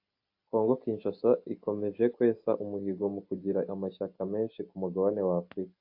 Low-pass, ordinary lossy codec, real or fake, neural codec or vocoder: 5.4 kHz; AAC, 48 kbps; real; none